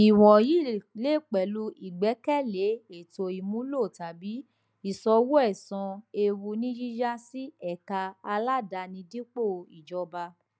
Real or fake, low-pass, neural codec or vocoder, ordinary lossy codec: real; none; none; none